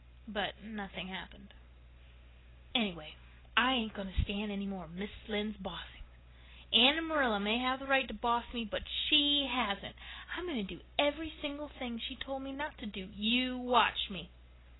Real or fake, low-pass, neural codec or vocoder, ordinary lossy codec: real; 7.2 kHz; none; AAC, 16 kbps